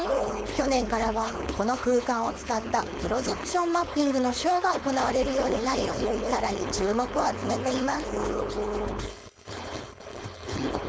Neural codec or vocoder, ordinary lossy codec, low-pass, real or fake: codec, 16 kHz, 4.8 kbps, FACodec; none; none; fake